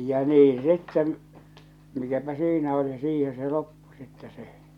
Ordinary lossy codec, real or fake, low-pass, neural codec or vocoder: MP3, 96 kbps; real; 19.8 kHz; none